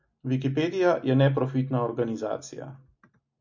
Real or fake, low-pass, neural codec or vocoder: real; 7.2 kHz; none